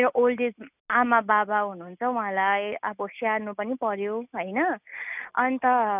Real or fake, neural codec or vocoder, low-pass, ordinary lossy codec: real; none; 3.6 kHz; none